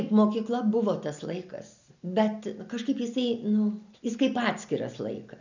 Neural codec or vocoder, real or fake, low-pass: none; real; 7.2 kHz